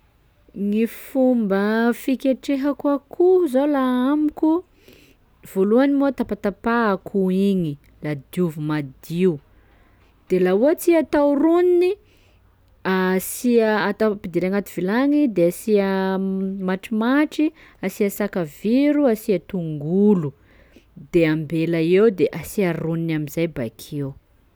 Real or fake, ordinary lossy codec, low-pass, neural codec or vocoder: real; none; none; none